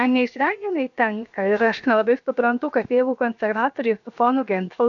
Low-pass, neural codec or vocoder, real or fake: 7.2 kHz; codec, 16 kHz, 0.7 kbps, FocalCodec; fake